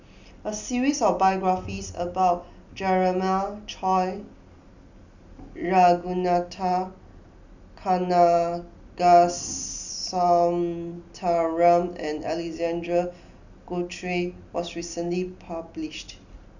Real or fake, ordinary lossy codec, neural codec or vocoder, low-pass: real; none; none; 7.2 kHz